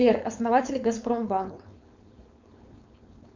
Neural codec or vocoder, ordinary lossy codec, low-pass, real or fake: codec, 16 kHz, 4.8 kbps, FACodec; MP3, 64 kbps; 7.2 kHz; fake